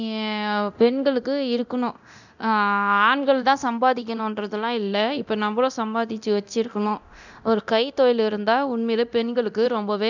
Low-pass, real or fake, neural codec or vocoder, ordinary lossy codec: 7.2 kHz; fake; codec, 24 kHz, 0.9 kbps, DualCodec; none